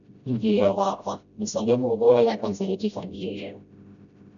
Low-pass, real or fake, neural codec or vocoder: 7.2 kHz; fake; codec, 16 kHz, 0.5 kbps, FreqCodec, smaller model